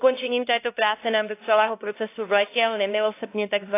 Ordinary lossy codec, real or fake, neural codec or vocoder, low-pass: AAC, 24 kbps; fake; codec, 16 kHz, 1 kbps, X-Codec, HuBERT features, trained on LibriSpeech; 3.6 kHz